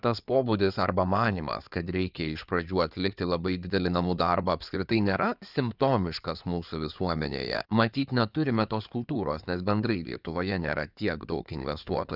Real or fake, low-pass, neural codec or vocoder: fake; 5.4 kHz; codec, 16 kHz in and 24 kHz out, 2.2 kbps, FireRedTTS-2 codec